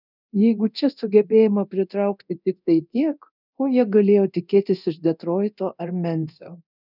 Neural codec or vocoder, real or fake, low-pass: codec, 24 kHz, 0.9 kbps, DualCodec; fake; 5.4 kHz